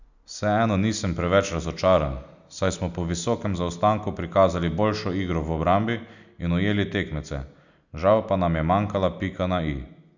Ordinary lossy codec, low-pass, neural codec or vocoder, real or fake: none; 7.2 kHz; none; real